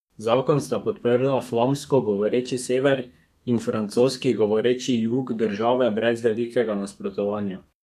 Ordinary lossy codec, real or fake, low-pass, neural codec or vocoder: none; fake; 14.4 kHz; codec, 32 kHz, 1.9 kbps, SNAC